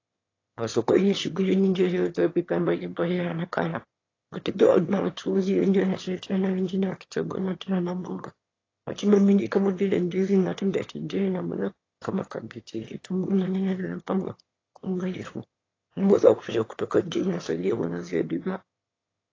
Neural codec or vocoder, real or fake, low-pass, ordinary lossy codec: autoencoder, 22.05 kHz, a latent of 192 numbers a frame, VITS, trained on one speaker; fake; 7.2 kHz; AAC, 32 kbps